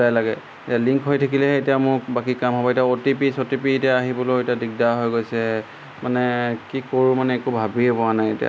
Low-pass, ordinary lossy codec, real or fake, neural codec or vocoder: none; none; real; none